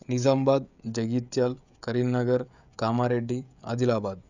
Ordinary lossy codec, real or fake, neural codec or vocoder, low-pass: none; fake; codec, 16 kHz, 16 kbps, FreqCodec, smaller model; 7.2 kHz